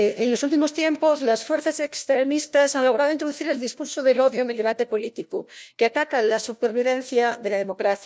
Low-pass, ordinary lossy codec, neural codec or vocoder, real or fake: none; none; codec, 16 kHz, 1 kbps, FunCodec, trained on LibriTTS, 50 frames a second; fake